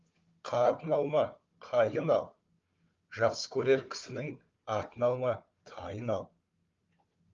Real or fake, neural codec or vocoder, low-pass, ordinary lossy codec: fake; codec, 16 kHz, 4 kbps, FunCodec, trained on Chinese and English, 50 frames a second; 7.2 kHz; Opus, 32 kbps